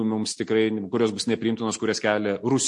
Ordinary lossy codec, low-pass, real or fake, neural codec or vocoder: MP3, 48 kbps; 10.8 kHz; real; none